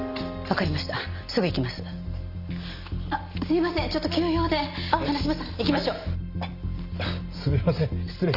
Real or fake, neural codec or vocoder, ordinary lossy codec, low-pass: real; none; Opus, 32 kbps; 5.4 kHz